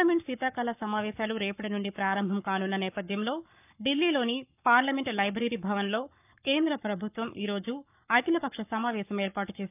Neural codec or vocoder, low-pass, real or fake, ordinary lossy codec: codec, 44.1 kHz, 7.8 kbps, Pupu-Codec; 3.6 kHz; fake; none